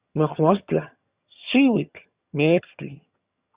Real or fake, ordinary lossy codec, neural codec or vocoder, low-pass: fake; Opus, 64 kbps; vocoder, 22.05 kHz, 80 mel bands, HiFi-GAN; 3.6 kHz